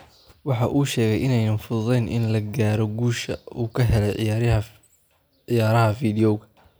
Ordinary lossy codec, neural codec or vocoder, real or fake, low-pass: none; none; real; none